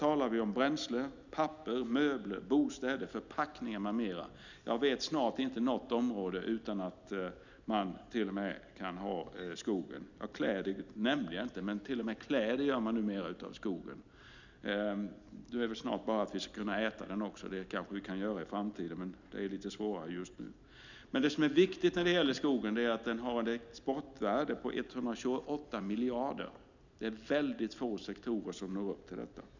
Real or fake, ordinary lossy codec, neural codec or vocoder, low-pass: real; none; none; 7.2 kHz